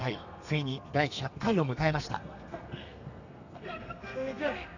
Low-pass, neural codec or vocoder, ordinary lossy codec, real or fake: 7.2 kHz; codec, 32 kHz, 1.9 kbps, SNAC; none; fake